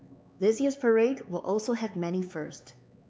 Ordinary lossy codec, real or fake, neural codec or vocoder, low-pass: none; fake; codec, 16 kHz, 4 kbps, X-Codec, HuBERT features, trained on LibriSpeech; none